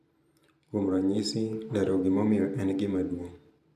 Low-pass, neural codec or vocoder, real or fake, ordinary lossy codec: 14.4 kHz; vocoder, 44.1 kHz, 128 mel bands every 512 samples, BigVGAN v2; fake; none